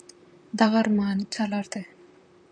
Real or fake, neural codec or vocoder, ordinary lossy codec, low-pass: fake; vocoder, 44.1 kHz, 128 mel bands, Pupu-Vocoder; MP3, 96 kbps; 9.9 kHz